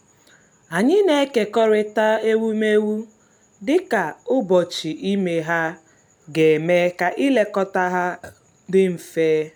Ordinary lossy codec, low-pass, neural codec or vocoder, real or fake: none; none; none; real